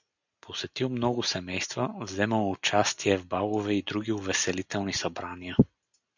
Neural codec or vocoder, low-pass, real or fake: none; 7.2 kHz; real